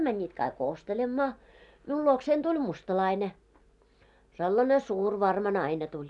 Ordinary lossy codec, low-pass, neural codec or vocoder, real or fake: none; 10.8 kHz; none; real